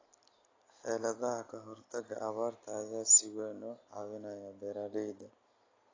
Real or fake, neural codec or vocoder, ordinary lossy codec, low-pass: real; none; AAC, 32 kbps; 7.2 kHz